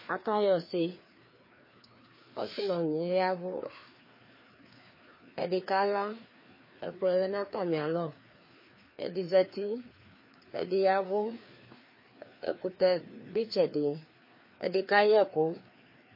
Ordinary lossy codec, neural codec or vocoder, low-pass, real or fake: MP3, 24 kbps; codec, 16 kHz, 2 kbps, FreqCodec, larger model; 5.4 kHz; fake